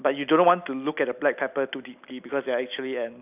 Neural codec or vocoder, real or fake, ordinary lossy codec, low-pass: none; real; none; 3.6 kHz